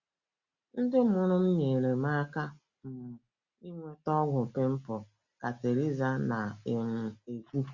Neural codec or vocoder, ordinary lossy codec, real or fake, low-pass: none; Opus, 64 kbps; real; 7.2 kHz